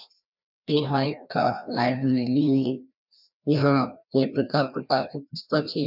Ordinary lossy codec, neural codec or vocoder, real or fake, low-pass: none; codec, 16 kHz, 1 kbps, FreqCodec, larger model; fake; 5.4 kHz